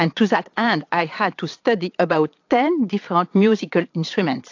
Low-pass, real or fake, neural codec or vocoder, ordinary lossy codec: 7.2 kHz; fake; vocoder, 22.05 kHz, 80 mel bands, Vocos; AAC, 48 kbps